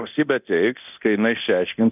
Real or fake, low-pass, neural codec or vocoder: fake; 3.6 kHz; codec, 24 kHz, 0.9 kbps, DualCodec